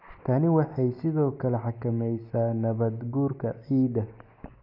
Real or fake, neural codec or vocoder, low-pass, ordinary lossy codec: real; none; 5.4 kHz; AAC, 48 kbps